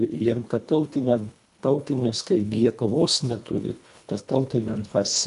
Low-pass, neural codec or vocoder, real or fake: 10.8 kHz; codec, 24 kHz, 1.5 kbps, HILCodec; fake